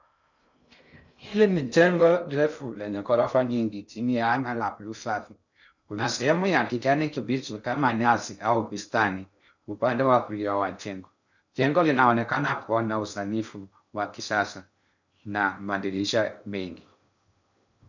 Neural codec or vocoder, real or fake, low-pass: codec, 16 kHz in and 24 kHz out, 0.6 kbps, FocalCodec, streaming, 2048 codes; fake; 7.2 kHz